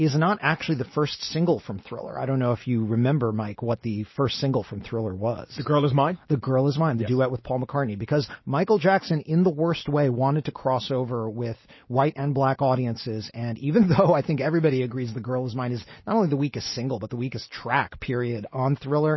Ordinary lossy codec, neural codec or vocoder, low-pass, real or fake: MP3, 24 kbps; none; 7.2 kHz; real